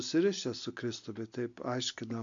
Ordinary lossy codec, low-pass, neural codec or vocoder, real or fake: MP3, 64 kbps; 7.2 kHz; none; real